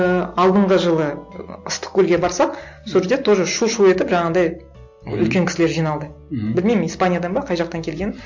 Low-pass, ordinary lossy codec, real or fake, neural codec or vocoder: 7.2 kHz; MP3, 48 kbps; real; none